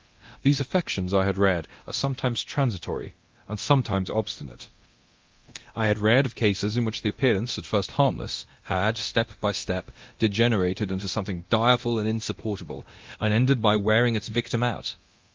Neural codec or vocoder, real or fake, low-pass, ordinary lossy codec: codec, 24 kHz, 0.9 kbps, DualCodec; fake; 7.2 kHz; Opus, 32 kbps